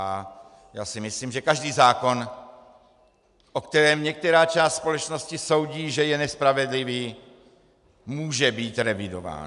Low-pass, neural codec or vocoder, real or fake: 10.8 kHz; none; real